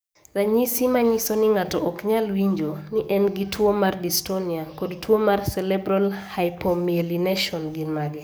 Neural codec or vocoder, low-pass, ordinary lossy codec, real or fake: codec, 44.1 kHz, 7.8 kbps, DAC; none; none; fake